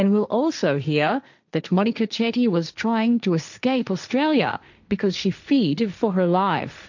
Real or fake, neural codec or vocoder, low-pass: fake; codec, 16 kHz, 1.1 kbps, Voila-Tokenizer; 7.2 kHz